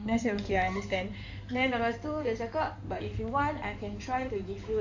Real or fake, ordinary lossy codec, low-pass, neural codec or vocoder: fake; AAC, 48 kbps; 7.2 kHz; codec, 16 kHz in and 24 kHz out, 2.2 kbps, FireRedTTS-2 codec